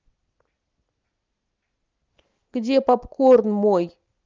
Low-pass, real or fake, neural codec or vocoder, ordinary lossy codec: 7.2 kHz; real; none; Opus, 24 kbps